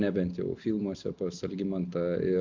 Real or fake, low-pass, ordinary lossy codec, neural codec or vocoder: real; 7.2 kHz; MP3, 64 kbps; none